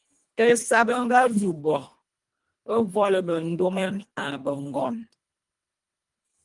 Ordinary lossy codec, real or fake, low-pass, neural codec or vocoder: Opus, 32 kbps; fake; 10.8 kHz; codec, 24 kHz, 1.5 kbps, HILCodec